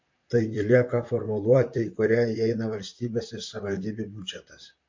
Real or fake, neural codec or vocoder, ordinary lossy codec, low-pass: fake; vocoder, 44.1 kHz, 128 mel bands, Pupu-Vocoder; MP3, 48 kbps; 7.2 kHz